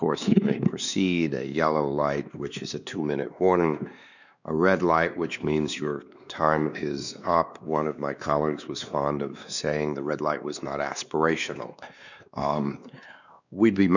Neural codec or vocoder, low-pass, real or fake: codec, 16 kHz, 2 kbps, X-Codec, WavLM features, trained on Multilingual LibriSpeech; 7.2 kHz; fake